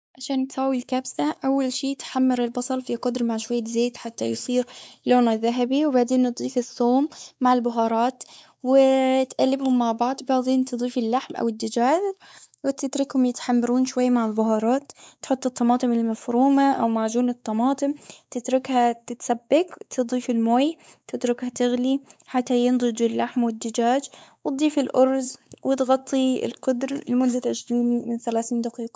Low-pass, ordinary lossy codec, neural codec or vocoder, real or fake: none; none; codec, 16 kHz, 4 kbps, X-Codec, WavLM features, trained on Multilingual LibriSpeech; fake